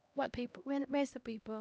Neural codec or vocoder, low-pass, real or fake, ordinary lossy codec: codec, 16 kHz, 1 kbps, X-Codec, HuBERT features, trained on LibriSpeech; none; fake; none